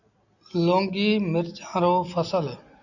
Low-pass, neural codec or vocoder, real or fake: 7.2 kHz; none; real